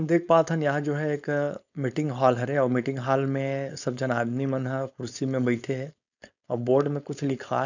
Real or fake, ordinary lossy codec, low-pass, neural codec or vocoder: fake; none; 7.2 kHz; codec, 16 kHz, 4.8 kbps, FACodec